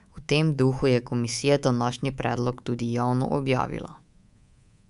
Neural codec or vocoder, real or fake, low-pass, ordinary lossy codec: codec, 24 kHz, 3.1 kbps, DualCodec; fake; 10.8 kHz; none